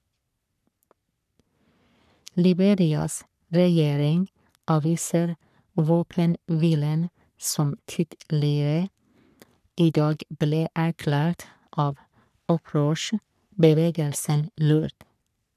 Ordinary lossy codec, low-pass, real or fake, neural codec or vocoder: none; 14.4 kHz; fake; codec, 44.1 kHz, 3.4 kbps, Pupu-Codec